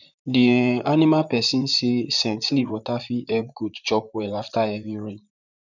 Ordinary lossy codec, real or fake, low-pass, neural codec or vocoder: none; fake; 7.2 kHz; vocoder, 44.1 kHz, 128 mel bands, Pupu-Vocoder